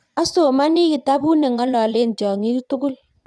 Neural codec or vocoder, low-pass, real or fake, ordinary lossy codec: vocoder, 22.05 kHz, 80 mel bands, Vocos; none; fake; none